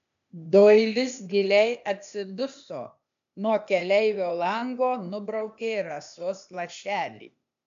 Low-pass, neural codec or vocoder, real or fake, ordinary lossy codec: 7.2 kHz; codec, 16 kHz, 0.8 kbps, ZipCodec; fake; MP3, 64 kbps